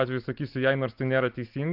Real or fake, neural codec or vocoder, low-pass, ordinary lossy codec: real; none; 5.4 kHz; Opus, 32 kbps